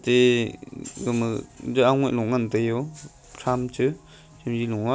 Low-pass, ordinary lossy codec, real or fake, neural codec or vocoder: none; none; real; none